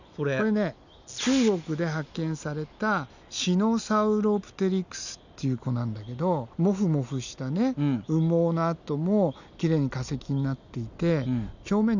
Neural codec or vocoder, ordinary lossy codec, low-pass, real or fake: none; none; 7.2 kHz; real